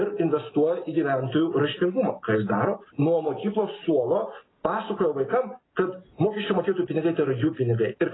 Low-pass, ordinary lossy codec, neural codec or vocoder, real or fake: 7.2 kHz; AAC, 16 kbps; none; real